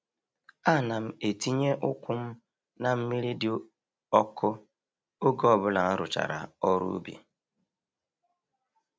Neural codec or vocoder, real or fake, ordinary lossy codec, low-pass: none; real; none; none